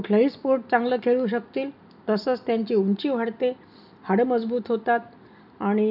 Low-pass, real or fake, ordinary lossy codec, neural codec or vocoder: 5.4 kHz; real; none; none